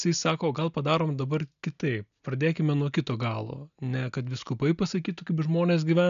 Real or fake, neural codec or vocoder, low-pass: real; none; 7.2 kHz